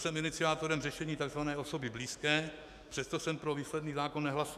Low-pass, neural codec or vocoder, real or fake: 14.4 kHz; autoencoder, 48 kHz, 128 numbers a frame, DAC-VAE, trained on Japanese speech; fake